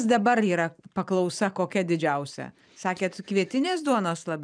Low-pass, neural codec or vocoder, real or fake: 9.9 kHz; vocoder, 44.1 kHz, 128 mel bands every 512 samples, BigVGAN v2; fake